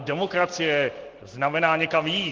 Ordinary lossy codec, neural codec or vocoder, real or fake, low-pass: Opus, 16 kbps; none; real; 7.2 kHz